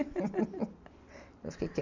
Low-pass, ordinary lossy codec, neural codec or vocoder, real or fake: 7.2 kHz; none; none; real